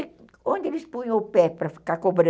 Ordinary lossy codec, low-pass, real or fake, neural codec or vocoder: none; none; real; none